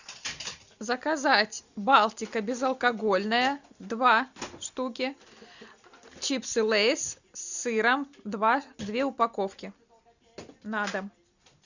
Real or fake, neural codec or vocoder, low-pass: real; none; 7.2 kHz